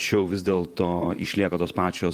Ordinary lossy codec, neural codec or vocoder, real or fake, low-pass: Opus, 32 kbps; vocoder, 44.1 kHz, 128 mel bands, Pupu-Vocoder; fake; 14.4 kHz